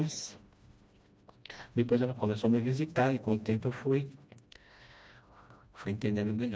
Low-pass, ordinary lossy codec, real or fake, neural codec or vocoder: none; none; fake; codec, 16 kHz, 1 kbps, FreqCodec, smaller model